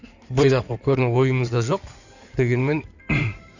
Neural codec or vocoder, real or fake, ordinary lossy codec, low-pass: codec, 16 kHz in and 24 kHz out, 2.2 kbps, FireRedTTS-2 codec; fake; none; 7.2 kHz